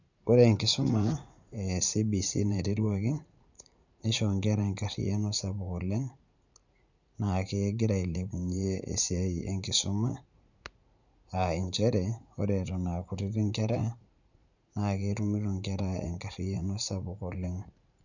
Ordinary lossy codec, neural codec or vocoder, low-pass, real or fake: none; vocoder, 44.1 kHz, 80 mel bands, Vocos; 7.2 kHz; fake